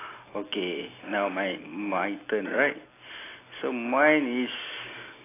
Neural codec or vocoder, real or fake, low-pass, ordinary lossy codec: none; real; 3.6 kHz; AAC, 16 kbps